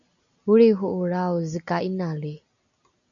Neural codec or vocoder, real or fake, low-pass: none; real; 7.2 kHz